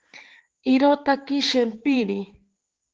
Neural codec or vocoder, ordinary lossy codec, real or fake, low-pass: codec, 16 kHz, 4 kbps, X-Codec, HuBERT features, trained on balanced general audio; Opus, 16 kbps; fake; 7.2 kHz